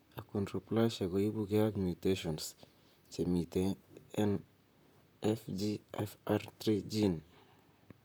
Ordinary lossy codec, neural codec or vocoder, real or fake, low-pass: none; vocoder, 44.1 kHz, 128 mel bands, Pupu-Vocoder; fake; none